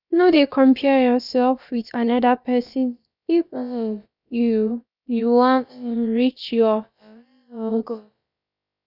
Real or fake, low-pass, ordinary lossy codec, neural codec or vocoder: fake; 5.4 kHz; none; codec, 16 kHz, about 1 kbps, DyCAST, with the encoder's durations